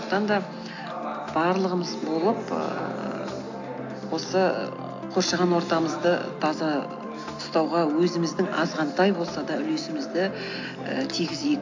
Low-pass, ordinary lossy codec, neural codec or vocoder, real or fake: 7.2 kHz; AAC, 48 kbps; none; real